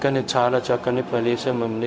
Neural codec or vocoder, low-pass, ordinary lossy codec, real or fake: codec, 16 kHz, 0.4 kbps, LongCat-Audio-Codec; none; none; fake